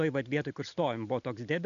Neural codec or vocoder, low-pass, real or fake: none; 7.2 kHz; real